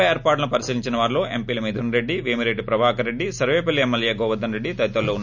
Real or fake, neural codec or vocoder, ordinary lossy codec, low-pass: real; none; none; 7.2 kHz